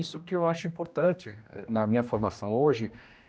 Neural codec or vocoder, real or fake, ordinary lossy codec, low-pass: codec, 16 kHz, 1 kbps, X-Codec, HuBERT features, trained on general audio; fake; none; none